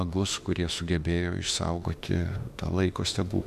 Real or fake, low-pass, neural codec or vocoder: fake; 14.4 kHz; autoencoder, 48 kHz, 32 numbers a frame, DAC-VAE, trained on Japanese speech